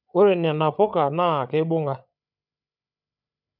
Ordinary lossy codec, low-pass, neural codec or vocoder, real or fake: none; 5.4 kHz; codec, 16 kHz, 8 kbps, FreqCodec, larger model; fake